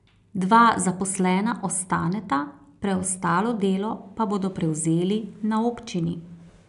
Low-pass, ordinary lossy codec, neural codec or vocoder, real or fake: 10.8 kHz; none; none; real